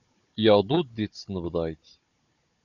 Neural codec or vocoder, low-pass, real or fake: codec, 16 kHz, 16 kbps, FunCodec, trained on Chinese and English, 50 frames a second; 7.2 kHz; fake